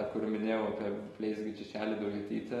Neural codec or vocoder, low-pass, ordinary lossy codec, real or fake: none; 19.8 kHz; AAC, 32 kbps; real